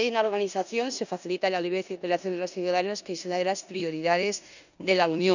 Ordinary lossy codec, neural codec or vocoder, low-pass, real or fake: none; codec, 16 kHz in and 24 kHz out, 0.9 kbps, LongCat-Audio-Codec, four codebook decoder; 7.2 kHz; fake